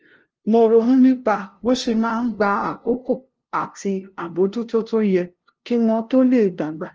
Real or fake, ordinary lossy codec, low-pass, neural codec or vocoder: fake; Opus, 16 kbps; 7.2 kHz; codec, 16 kHz, 0.5 kbps, FunCodec, trained on LibriTTS, 25 frames a second